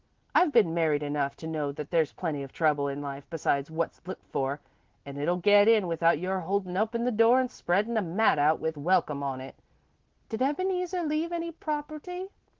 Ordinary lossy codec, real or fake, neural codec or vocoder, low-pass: Opus, 16 kbps; real; none; 7.2 kHz